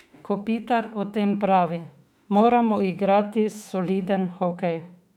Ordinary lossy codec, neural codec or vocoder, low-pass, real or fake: none; autoencoder, 48 kHz, 32 numbers a frame, DAC-VAE, trained on Japanese speech; 19.8 kHz; fake